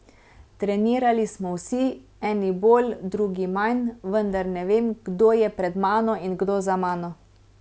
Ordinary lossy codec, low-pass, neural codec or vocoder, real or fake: none; none; none; real